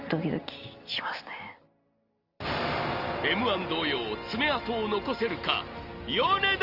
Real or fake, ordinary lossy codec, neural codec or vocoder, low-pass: real; Opus, 24 kbps; none; 5.4 kHz